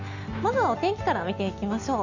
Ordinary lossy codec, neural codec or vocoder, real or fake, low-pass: none; none; real; 7.2 kHz